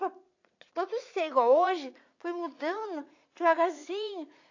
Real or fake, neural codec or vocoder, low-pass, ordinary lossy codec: fake; vocoder, 44.1 kHz, 80 mel bands, Vocos; 7.2 kHz; AAC, 48 kbps